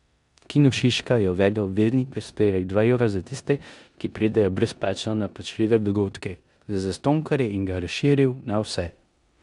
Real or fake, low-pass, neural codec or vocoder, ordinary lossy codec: fake; 10.8 kHz; codec, 16 kHz in and 24 kHz out, 0.9 kbps, LongCat-Audio-Codec, four codebook decoder; none